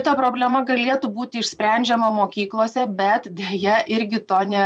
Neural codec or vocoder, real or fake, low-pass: vocoder, 24 kHz, 100 mel bands, Vocos; fake; 9.9 kHz